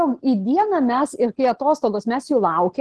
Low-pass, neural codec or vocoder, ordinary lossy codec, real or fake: 10.8 kHz; none; Opus, 16 kbps; real